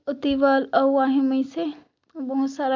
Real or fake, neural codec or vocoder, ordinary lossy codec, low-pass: real; none; MP3, 64 kbps; 7.2 kHz